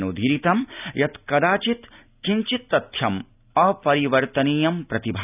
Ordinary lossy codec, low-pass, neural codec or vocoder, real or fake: none; 3.6 kHz; none; real